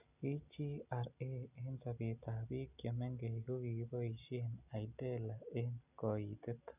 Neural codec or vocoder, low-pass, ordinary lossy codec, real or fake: none; 3.6 kHz; none; real